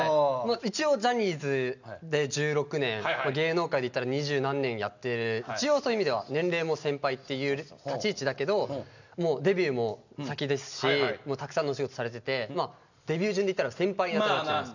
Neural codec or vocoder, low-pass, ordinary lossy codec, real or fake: none; 7.2 kHz; none; real